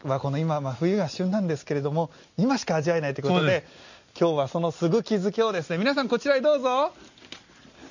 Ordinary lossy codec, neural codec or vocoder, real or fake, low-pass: none; none; real; 7.2 kHz